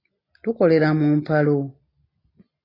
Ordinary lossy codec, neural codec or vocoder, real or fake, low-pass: AAC, 24 kbps; none; real; 5.4 kHz